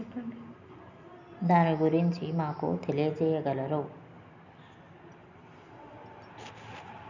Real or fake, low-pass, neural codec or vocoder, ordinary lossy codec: real; 7.2 kHz; none; none